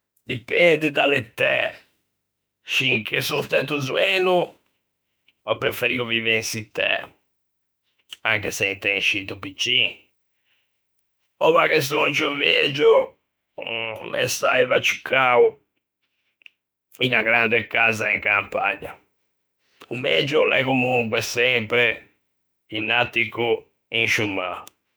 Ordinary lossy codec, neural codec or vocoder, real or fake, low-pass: none; autoencoder, 48 kHz, 32 numbers a frame, DAC-VAE, trained on Japanese speech; fake; none